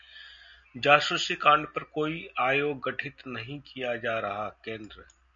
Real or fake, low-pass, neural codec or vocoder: real; 7.2 kHz; none